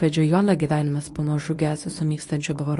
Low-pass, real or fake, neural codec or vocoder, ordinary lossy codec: 10.8 kHz; fake; codec, 24 kHz, 0.9 kbps, WavTokenizer, medium speech release version 1; AAC, 48 kbps